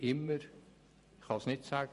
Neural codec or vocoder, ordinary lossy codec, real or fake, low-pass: vocoder, 44.1 kHz, 128 mel bands every 512 samples, BigVGAN v2; MP3, 48 kbps; fake; 14.4 kHz